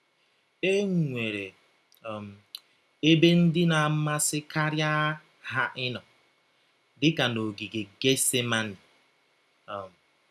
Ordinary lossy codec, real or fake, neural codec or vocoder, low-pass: none; real; none; none